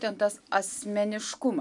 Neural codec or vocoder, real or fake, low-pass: none; real; 10.8 kHz